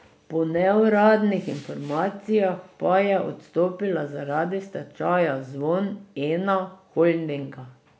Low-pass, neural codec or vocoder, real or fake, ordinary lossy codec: none; none; real; none